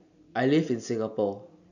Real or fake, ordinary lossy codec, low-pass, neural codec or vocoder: real; none; 7.2 kHz; none